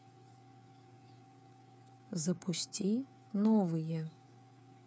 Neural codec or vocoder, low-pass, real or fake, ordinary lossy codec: codec, 16 kHz, 8 kbps, FreqCodec, smaller model; none; fake; none